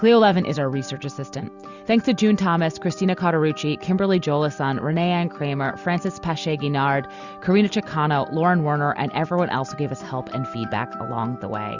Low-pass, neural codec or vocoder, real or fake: 7.2 kHz; none; real